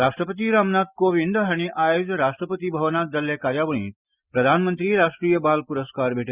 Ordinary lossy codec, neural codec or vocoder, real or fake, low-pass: Opus, 64 kbps; none; real; 3.6 kHz